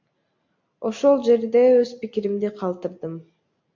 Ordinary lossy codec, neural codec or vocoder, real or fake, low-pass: MP3, 48 kbps; none; real; 7.2 kHz